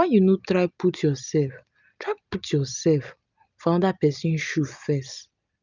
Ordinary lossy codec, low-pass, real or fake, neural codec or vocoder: none; 7.2 kHz; real; none